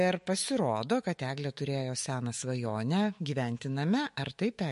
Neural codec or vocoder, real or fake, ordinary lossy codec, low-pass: autoencoder, 48 kHz, 128 numbers a frame, DAC-VAE, trained on Japanese speech; fake; MP3, 48 kbps; 14.4 kHz